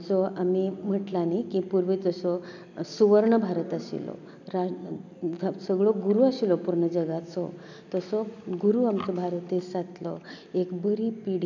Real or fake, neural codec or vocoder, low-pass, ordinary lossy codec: real; none; 7.2 kHz; none